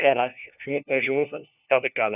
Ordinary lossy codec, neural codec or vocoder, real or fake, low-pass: none; codec, 16 kHz, 1 kbps, FunCodec, trained on LibriTTS, 50 frames a second; fake; 3.6 kHz